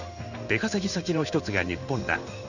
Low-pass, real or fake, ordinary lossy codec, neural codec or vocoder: 7.2 kHz; fake; none; codec, 16 kHz in and 24 kHz out, 1 kbps, XY-Tokenizer